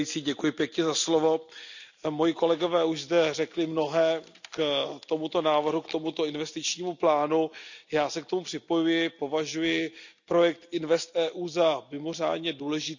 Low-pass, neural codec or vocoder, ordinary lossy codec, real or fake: 7.2 kHz; none; none; real